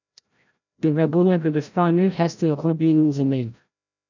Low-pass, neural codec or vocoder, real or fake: 7.2 kHz; codec, 16 kHz, 0.5 kbps, FreqCodec, larger model; fake